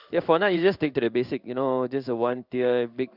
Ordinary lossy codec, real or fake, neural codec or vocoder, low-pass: Opus, 64 kbps; fake; codec, 16 kHz in and 24 kHz out, 1 kbps, XY-Tokenizer; 5.4 kHz